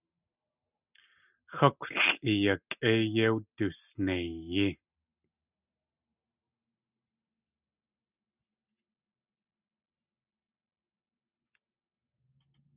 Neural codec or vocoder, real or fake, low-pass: none; real; 3.6 kHz